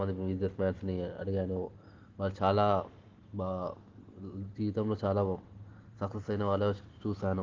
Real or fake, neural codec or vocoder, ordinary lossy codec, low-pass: real; none; Opus, 16 kbps; 7.2 kHz